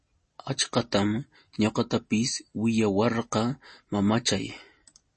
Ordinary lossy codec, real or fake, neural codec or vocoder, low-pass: MP3, 32 kbps; real; none; 10.8 kHz